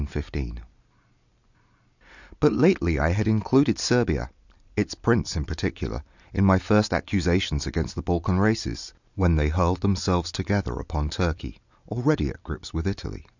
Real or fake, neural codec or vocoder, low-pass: real; none; 7.2 kHz